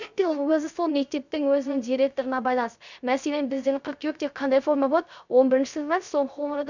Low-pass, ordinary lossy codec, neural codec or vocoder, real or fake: 7.2 kHz; none; codec, 16 kHz, 0.3 kbps, FocalCodec; fake